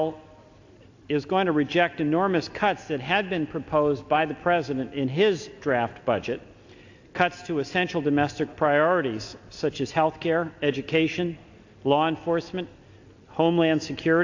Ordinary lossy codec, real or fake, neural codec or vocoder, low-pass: AAC, 48 kbps; real; none; 7.2 kHz